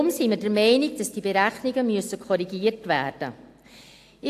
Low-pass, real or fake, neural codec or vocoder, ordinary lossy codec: 14.4 kHz; real; none; AAC, 64 kbps